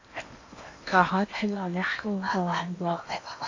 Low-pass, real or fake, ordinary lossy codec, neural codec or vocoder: 7.2 kHz; fake; AAC, 48 kbps; codec, 16 kHz in and 24 kHz out, 0.8 kbps, FocalCodec, streaming, 65536 codes